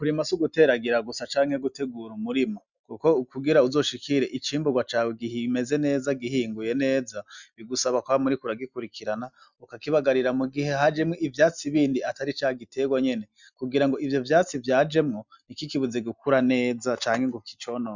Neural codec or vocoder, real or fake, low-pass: none; real; 7.2 kHz